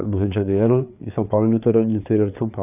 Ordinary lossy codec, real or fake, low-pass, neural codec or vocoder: none; fake; 3.6 kHz; codec, 16 kHz, 4 kbps, FreqCodec, larger model